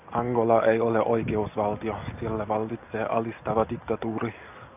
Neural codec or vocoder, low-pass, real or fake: none; 3.6 kHz; real